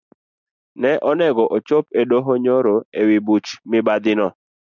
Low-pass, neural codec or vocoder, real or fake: 7.2 kHz; none; real